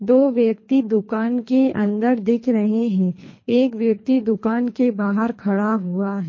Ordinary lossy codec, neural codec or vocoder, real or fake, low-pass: MP3, 32 kbps; codec, 24 kHz, 3 kbps, HILCodec; fake; 7.2 kHz